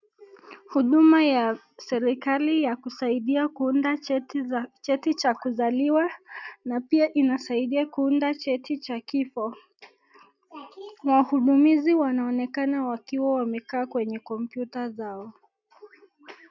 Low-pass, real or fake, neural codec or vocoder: 7.2 kHz; real; none